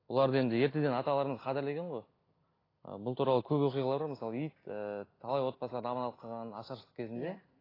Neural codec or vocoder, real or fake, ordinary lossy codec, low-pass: none; real; AAC, 24 kbps; 5.4 kHz